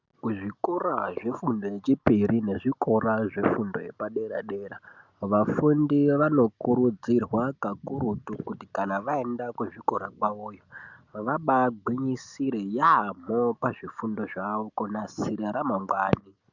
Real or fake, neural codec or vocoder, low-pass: real; none; 7.2 kHz